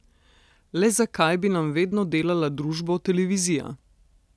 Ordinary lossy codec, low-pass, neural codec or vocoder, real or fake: none; none; none; real